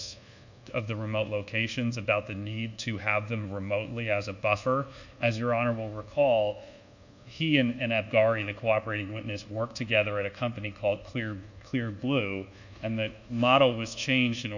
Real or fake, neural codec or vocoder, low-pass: fake; codec, 24 kHz, 1.2 kbps, DualCodec; 7.2 kHz